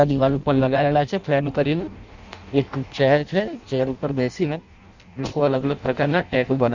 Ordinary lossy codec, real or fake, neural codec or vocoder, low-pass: none; fake; codec, 16 kHz in and 24 kHz out, 0.6 kbps, FireRedTTS-2 codec; 7.2 kHz